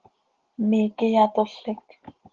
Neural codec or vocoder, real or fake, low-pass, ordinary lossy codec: none; real; 7.2 kHz; Opus, 16 kbps